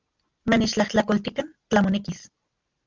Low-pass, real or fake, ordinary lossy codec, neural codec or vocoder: 7.2 kHz; real; Opus, 32 kbps; none